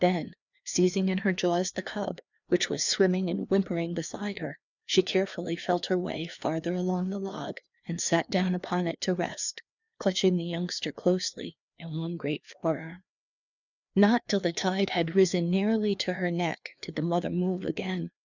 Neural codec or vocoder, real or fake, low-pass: codec, 16 kHz, 2 kbps, FreqCodec, larger model; fake; 7.2 kHz